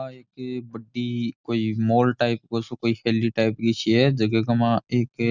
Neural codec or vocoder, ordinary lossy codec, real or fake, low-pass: none; none; real; 7.2 kHz